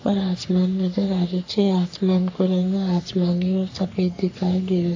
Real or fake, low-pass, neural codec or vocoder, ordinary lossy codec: fake; 7.2 kHz; codec, 44.1 kHz, 3.4 kbps, Pupu-Codec; AAC, 48 kbps